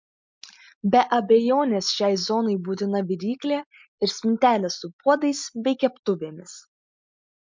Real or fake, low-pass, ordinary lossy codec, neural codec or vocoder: real; 7.2 kHz; MP3, 64 kbps; none